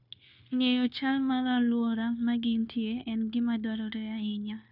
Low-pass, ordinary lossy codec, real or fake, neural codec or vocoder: 5.4 kHz; none; fake; codec, 16 kHz, 0.9 kbps, LongCat-Audio-Codec